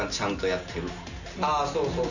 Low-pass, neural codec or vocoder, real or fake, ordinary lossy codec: 7.2 kHz; none; real; none